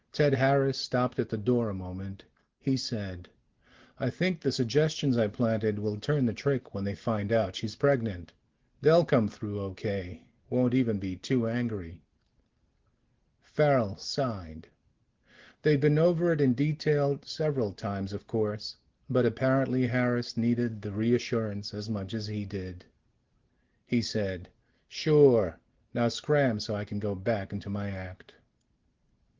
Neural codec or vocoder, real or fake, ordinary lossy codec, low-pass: none; real; Opus, 16 kbps; 7.2 kHz